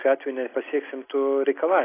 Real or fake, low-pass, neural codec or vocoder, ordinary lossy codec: real; 3.6 kHz; none; AAC, 16 kbps